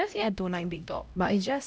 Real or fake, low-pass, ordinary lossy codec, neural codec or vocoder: fake; none; none; codec, 16 kHz, 0.5 kbps, X-Codec, HuBERT features, trained on LibriSpeech